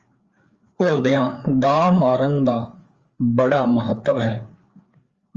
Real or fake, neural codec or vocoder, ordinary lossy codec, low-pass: fake; codec, 16 kHz, 4 kbps, FreqCodec, larger model; Opus, 32 kbps; 7.2 kHz